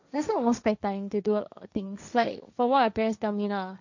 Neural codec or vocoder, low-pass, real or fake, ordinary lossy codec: codec, 16 kHz, 1.1 kbps, Voila-Tokenizer; 7.2 kHz; fake; none